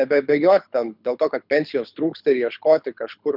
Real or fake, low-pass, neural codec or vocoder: fake; 5.4 kHz; codec, 24 kHz, 6 kbps, HILCodec